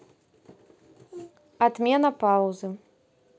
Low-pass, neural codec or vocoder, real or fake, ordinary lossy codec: none; none; real; none